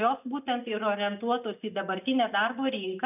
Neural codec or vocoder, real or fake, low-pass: none; real; 3.6 kHz